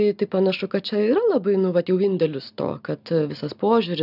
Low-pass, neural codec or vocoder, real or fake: 5.4 kHz; none; real